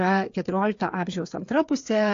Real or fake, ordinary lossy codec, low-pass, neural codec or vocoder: fake; AAC, 48 kbps; 7.2 kHz; codec, 16 kHz, 8 kbps, FreqCodec, smaller model